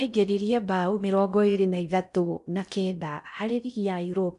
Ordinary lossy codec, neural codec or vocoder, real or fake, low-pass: none; codec, 16 kHz in and 24 kHz out, 0.6 kbps, FocalCodec, streaming, 4096 codes; fake; 10.8 kHz